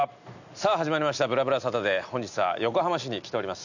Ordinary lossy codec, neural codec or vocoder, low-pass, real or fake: none; none; 7.2 kHz; real